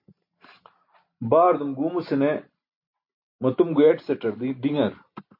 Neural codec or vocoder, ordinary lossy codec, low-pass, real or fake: none; MP3, 32 kbps; 5.4 kHz; real